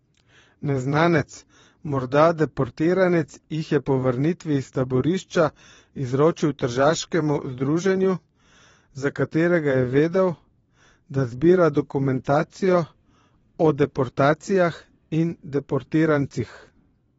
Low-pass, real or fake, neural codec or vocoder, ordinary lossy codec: 9.9 kHz; fake; vocoder, 22.05 kHz, 80 mel bands, WaveNeXt; AAC, 24 kbps